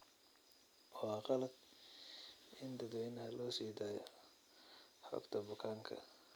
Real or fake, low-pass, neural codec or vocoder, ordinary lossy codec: real; none; none; none